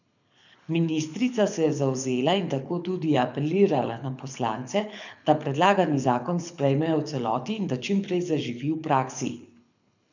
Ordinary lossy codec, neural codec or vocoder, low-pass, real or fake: none; codec, 24 kHz, 6 kbps, HILCodec; 7.2 kHz; fake